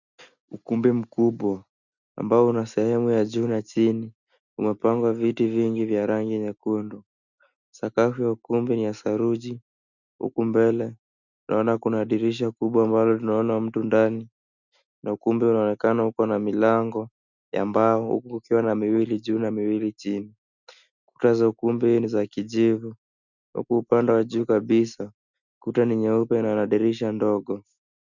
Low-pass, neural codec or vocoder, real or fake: 7.2 kHz; none; real